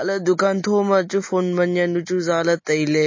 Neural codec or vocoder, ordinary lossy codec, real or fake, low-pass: none; MP3, 32 kbps; real; 7.2 kHz